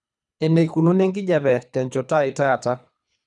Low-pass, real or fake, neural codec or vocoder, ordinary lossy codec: 10.8 kHz; fake; codec, 24 kHz, 3 kbps, HILCodec; none